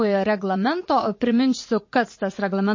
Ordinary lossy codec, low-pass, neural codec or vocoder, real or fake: MP3, 32 kbps; 7.2 kHz; vocoder, 44.1 kHz, 80 mel bands, Vocos; fake